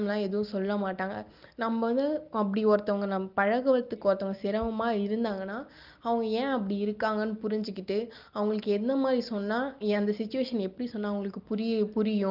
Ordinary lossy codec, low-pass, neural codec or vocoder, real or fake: Opus, 24 kbps; 5.4 kHz; none; real